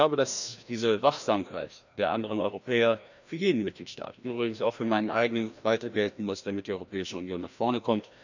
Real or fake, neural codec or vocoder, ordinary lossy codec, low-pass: fake; codec, 16 kHz, 1 kbps, FreqCodec, larger model; none; 7.2 kHz